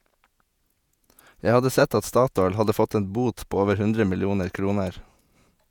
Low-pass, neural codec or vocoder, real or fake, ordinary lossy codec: 19.8 kHz; vocoder, 48 kHz, 128 mel bands, Vocos; fake; none